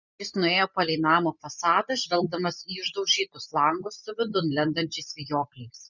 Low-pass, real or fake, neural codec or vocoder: 7.2 kHz; real; none